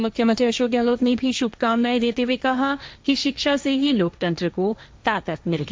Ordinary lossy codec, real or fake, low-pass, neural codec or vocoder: none; fake; none; codec, 16 kHz, 1.1 kbps, Voila-Tokenizer